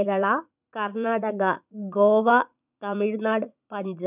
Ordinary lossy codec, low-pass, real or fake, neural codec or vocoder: none; 3.6 kHz; fake; vocoder, 44.1 kHz, 80 mel bands, Vocos